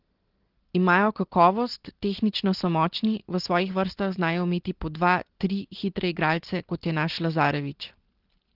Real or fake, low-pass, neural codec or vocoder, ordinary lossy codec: real; 5.4 kHz; none; Opus, 16 kbps